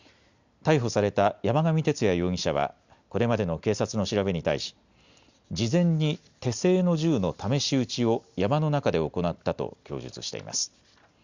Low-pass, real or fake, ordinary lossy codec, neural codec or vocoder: 7.2 kHz; real; Opus, 64 kbps; none